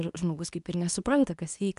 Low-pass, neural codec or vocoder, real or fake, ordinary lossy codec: 10.8 kHz; codec, 24 kHz, 0.9 kbps, WavTokenizer, medium speech release version 2; fake; MP3, 96 kbps